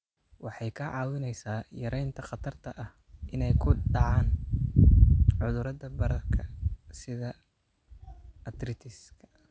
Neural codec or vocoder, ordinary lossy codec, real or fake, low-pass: none; none; real; none